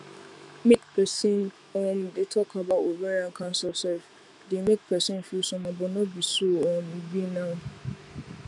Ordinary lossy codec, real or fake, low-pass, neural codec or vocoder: none; fake; 10.8 kHz; autoencoder, 48 kHz, 128 numbers a frame, DAC-VAE, trained on Japanese speech